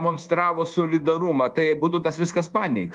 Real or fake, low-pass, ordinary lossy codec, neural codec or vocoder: fake; 10.8 kHz; Opus, 32 kbps; codec, 24 kHz, 1.2 kbps, DualCodec